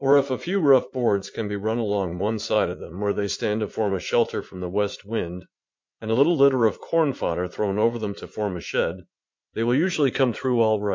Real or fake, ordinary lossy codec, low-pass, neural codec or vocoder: fake; MP3, 64 kbps; 7.2 kHz; vocoder, 44.1 kHz, 80 mel bands, Vocos